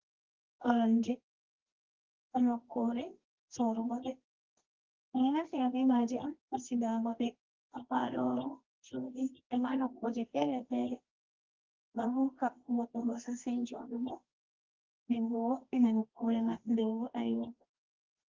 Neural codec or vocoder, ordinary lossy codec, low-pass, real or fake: codec, 24 kHz, 0.9 kbps, WavTokenizer, medium music audio release; Opus, 16 kbps; 7.2 kHz; fake